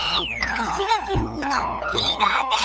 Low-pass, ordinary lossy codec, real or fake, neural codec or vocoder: none; none; fake; codec, 16 kHz, 8 kbps, FunCodec, trained on LibriTTS, 25 frames a second